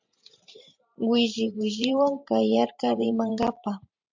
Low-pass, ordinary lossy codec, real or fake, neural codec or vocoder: 7.2 kHz; MP3, 64 kbps; fake; vocoder, 44.1 kHz, 128 mel bands every 512 samples, BigVGAN v2